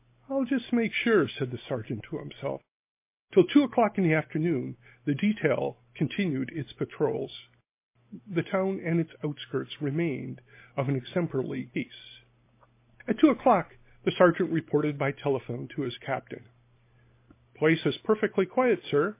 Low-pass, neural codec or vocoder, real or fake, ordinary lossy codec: 3.6 kHz; none; real; MP3, 24 kbps